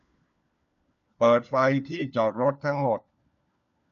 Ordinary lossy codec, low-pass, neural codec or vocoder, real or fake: none; 7.2 kHz; codec, 16 kHz, 4 kbps, FunCodec, trained on LibriTTS, 50 frames a second; fake